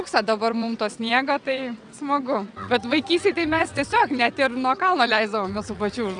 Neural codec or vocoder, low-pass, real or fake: vocoder, 22.05 kHz, 80 mel bands, WaveNeXt; 9.9 kHz; fake